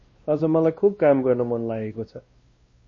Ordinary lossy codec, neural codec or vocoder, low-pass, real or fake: MP3, 32 kbps; codec, 16 kHz, 1 kbps, X-Codec, WavLM features, trained on Multilingual LibriSpeech; 7.2 kHz; fake